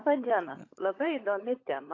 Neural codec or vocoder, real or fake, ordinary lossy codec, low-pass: codec, 16 kHz, 16 kbps, FunCodec, trained on LibriTTS, 50 frames a second; fake; AAC, 32 kbps; 7.2 kHz